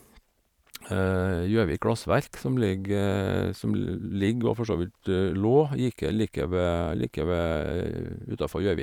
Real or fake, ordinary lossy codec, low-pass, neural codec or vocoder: fake; none; 19.8 kHz; vocoder, 44.1 kHz, 128 mel bands every 512 samples, BigVGAN v2